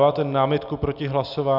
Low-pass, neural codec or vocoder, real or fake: 5.4 kHz; none; real